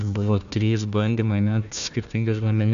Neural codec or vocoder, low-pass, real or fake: codec, 16 kHz, 1 kbps, FunCodec, trained on Chinese and English, 50 frames a second; 7.2 kHz; fake